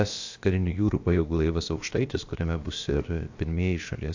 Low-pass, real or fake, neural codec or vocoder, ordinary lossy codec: 7.2 kHz; fake; codec, 16 kHz, about 1 kbps, DyCAST, with the encoder's durations; AAC, 48 kbps